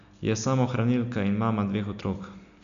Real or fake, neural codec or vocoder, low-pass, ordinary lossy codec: real; none; 7.2 kHz; none